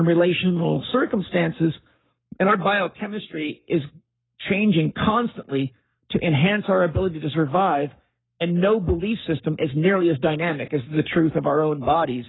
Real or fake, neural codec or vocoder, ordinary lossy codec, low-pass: fake; codec, 44.1 kHz, 7.8 kbps, Pupu-Codec; AAC, 16 kbps; 7.2 kHz